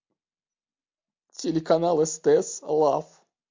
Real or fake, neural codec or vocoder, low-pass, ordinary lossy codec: real; none; 7.2 kHz; MP3, 48 kbps